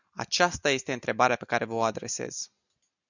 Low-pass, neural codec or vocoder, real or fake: 7.2 kHz; none; real